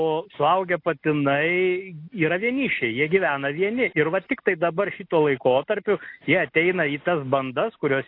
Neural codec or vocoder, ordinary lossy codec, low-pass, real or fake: none; AAC, 32 kbps; 5.4 kHz; real